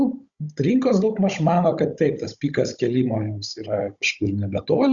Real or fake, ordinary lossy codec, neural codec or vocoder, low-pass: fake; Opus, 64 kbps; codec, 16 kHz, 16 kbps, FunCodec, trained on Chinese and English, 50 frames a second; 7.2 kHz